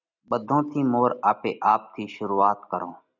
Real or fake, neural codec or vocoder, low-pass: real; none; 7.2 kHz